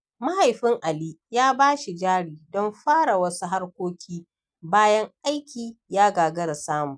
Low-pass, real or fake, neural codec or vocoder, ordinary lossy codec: 9.9 kHz; real; none; none